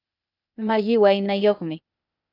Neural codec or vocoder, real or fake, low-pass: codec, 16 kHz, 0.8 kbps, ZipCodec; fake; 5.4 kHz